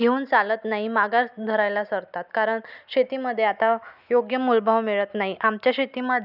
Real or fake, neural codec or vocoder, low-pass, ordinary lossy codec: real; none; 5.4 kHz; none